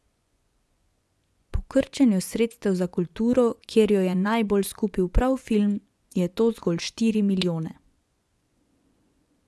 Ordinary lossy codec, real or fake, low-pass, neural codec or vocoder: none; fake; none; vocoder, 24 kHz, 100 mel bands, Vocos